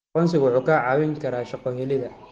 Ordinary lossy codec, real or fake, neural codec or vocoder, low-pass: Opus, 16 kbps; real; none; 10.8 kHz